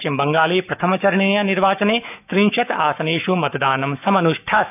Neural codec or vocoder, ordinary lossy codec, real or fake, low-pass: autoencoder, 48 kHz, 128 numbers a frame, DAC-VAE, trained on Japanese speech; none; fake; 3.6 kHz